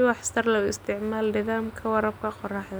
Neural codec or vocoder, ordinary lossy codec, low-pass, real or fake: none; none; none; real